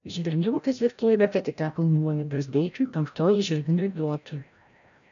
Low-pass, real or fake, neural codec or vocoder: 7.2 kHz; fake; codec, 16 kHz, 0.5 kbps, FreqCodec, larger model